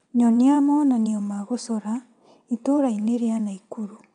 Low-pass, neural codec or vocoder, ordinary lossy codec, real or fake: 9.9 kHz; vocoder, 22.05 kHz, 80 mel bands, WaveNeXt; none; fake